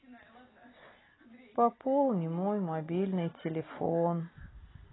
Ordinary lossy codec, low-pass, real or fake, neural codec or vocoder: AAC, 16 kbps; 7.2 kHz; real; none